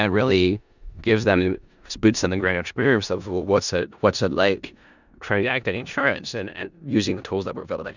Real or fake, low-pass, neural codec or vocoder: fake; 7.2 kHz; codec, 16 kHz in and 24 kHz out, 0.4 kbps, LongCat-Audio-Codec, four codebook decoder